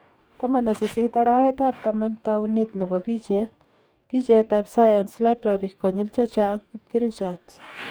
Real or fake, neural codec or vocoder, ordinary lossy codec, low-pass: fake; codec, 44.1 kHz, 2.6 kbps, DAC; none; none